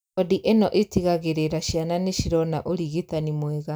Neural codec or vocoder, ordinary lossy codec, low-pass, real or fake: none; none; none; real